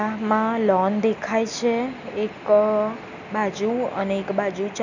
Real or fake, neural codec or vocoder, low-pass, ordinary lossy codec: real; none; 7.2 kHz; none